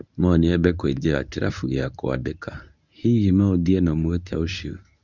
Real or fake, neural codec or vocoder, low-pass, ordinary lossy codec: fake; codec, 24 kHz, 0.9 kbps, WavTokenizer, medium speech release version 1; 7.2 kHz; none